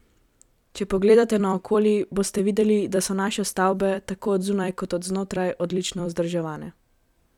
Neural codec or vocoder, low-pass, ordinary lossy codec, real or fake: vocoder, 44.1 kHz, 128 mel bands every 256 samples, BigVGAN v2; 19.8 kHz; none; fake